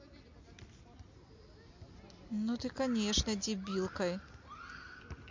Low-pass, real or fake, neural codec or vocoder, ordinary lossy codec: 7.2 kHz; real; none; MP3, 48 kbps